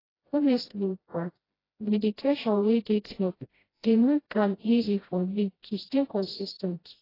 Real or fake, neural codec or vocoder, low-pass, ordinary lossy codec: fake; codec, 16 kHz, 0.5 kbps, FreqCodec, smaller model; 5.4 kHz; AAC, 24 kbps